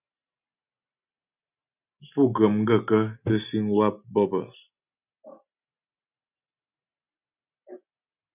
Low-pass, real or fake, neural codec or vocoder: 3.6 kHz; real; none